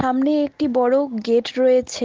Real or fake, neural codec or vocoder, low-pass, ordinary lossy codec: real; none; 7.2 kHz; Opus, 16 kbps